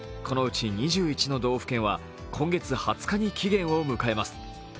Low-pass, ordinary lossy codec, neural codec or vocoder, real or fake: none; none; none; real